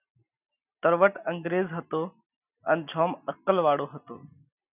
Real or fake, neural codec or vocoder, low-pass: real; none; 3.6 kHz